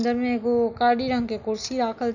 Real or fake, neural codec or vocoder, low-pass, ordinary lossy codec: real; none; 7.2 kHz; none